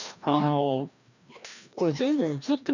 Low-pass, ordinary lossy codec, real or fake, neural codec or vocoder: 7.2 kHz; none; fake; codec, 16 kHz, 1 kbps, FreqCodec, larger model